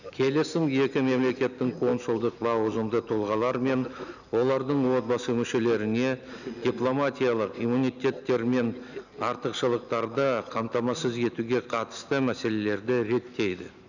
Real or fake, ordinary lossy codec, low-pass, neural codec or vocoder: real; none; 7.2 kHz; none